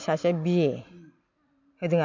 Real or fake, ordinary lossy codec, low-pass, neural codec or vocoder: real; MP3, 48 kbps; 7.2 kHz; none